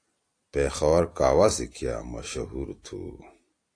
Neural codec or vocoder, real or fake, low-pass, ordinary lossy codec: none; real; 9.9 kHz; AAC, 48 kbps